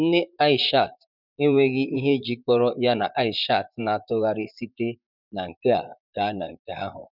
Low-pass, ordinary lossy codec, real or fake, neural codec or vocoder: 5.4 kHz; none; fake; vocoder, 44.1 kHz, 128 mel bands, Pupu-Vocoder